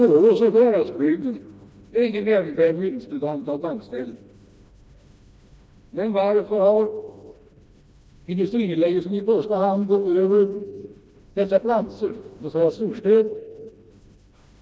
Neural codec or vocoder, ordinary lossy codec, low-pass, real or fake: codec, 16 kHz, 1 kbps, FreqCodec, smaller model; none; none; fake